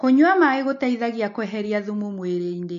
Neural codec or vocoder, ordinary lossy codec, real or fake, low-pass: none; AAC, 48 kbps; real; 7.2 kHz